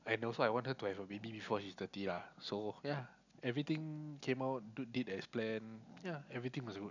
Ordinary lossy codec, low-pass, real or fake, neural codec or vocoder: none; 7.2 kHz; real; none